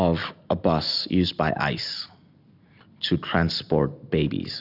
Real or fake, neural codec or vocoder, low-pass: real; none; 5.4 kHz